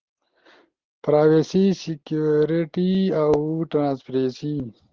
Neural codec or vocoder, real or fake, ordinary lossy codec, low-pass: none; real; Opus, 16 kbps; 7.2 kHz